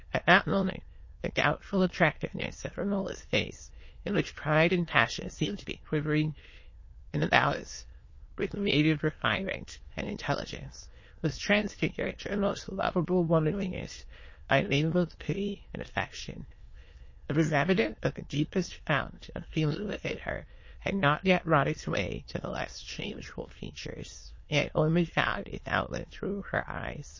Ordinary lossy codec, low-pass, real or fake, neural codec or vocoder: MP3, 32 kbps; 7.2 kHz; fake; autoencoder, 22.05 kHz, a latent of 192 numbers a frame, VITS, trained on many speakers